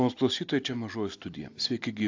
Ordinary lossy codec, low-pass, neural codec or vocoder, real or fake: AAC, 48 kbps; 7.2 kHz; none; real